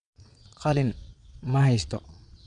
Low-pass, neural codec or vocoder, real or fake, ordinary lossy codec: 9.9 kHz; vocoder, 22.05 kHz, 80 mel bands, Vocos; fake; none